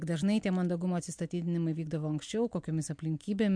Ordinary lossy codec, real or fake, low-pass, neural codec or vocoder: MP3, 64 kbps; real; 9.9 kHz; none